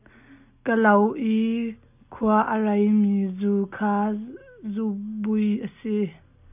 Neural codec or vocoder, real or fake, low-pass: none; real; 3.6 kHz